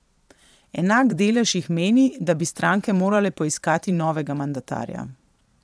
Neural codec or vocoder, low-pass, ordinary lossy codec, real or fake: vocoder, 22.05 kHz, 80 mel bands, Vocos; none; none; fake